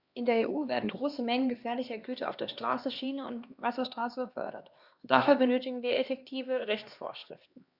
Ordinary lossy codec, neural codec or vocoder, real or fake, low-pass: Opus, 64 kbps; codec, 16 kHz, 2 kbps, X-Codec, HuBERT features, trained on LibriSpeech; fake; 5.4 kHz